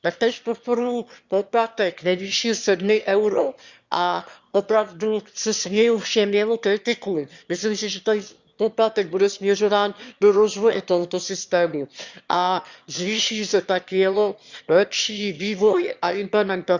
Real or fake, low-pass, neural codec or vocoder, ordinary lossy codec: fake; 7.2 kHz; autoencoder, 22.05 kHz, a latent of 192 numbers a frame, VITS, trained on one speaker; Opus, 64 kbps